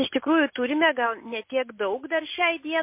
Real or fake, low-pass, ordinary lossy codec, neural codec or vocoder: real; 3.6 kHz; MP3, 24 kbps; none